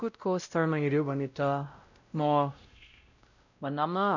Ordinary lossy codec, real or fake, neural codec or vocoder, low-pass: none; fake; codec, 16 kHz, 0.5 kbps, X-Codec, WavLM features, trained on Multilingual LibriSpeech; 7.2 kHz